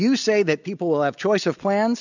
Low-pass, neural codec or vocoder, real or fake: 7.2 kHz; none; real